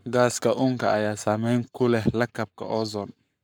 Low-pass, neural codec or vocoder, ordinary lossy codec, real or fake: none; codec, 44.1 kHz, 7.8 kbps, Pupu-Codec; none; fake